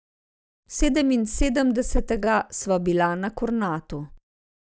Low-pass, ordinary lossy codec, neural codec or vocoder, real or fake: none; none; none; real